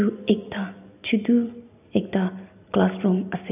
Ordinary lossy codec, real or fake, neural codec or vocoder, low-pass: AAC, 32 kbps; real; none; 3.6 kHz